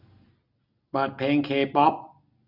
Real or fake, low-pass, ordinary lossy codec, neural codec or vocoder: real; 5.4 kHz; AAC, 48 kbps; none